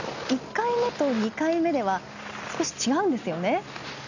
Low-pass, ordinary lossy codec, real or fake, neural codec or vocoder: 7.2 kHz; none; real; none